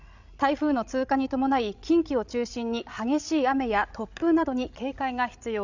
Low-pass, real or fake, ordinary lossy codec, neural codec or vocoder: 7.2 kHz; fake; none; codec, 16 kHz, 16 kbps, FreqCodec, larger model